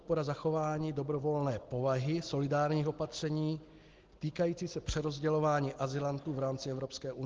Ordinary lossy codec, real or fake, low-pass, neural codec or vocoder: Opus, 16 kbps; real; 7.2 kHz; none